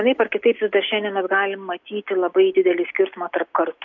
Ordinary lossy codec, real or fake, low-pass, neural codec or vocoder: MP3, 64 kbps; real; 7.2 kHz; none